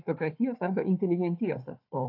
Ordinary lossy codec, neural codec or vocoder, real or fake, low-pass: AAC, 32 kbps; codec, 16 kHz, 4 kbps, FunCodec, trained on Chinese and English, 50 frames a second; fake; 5.4 kHz